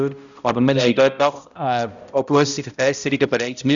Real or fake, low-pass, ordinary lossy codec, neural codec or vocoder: fake; 7.2 kHz; none; codec, 16 kHz, 0.5 kbps, X-Codec, HuBERT features, trained on balanced general audio